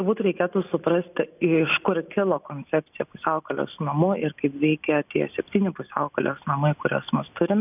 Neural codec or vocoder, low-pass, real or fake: none; 3.6 kHz; real